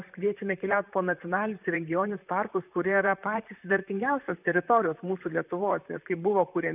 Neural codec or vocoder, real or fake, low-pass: vocoder, 44.1 kHz, 128 mel bands, Pupu-Vocoder; fake; 3.6 kHz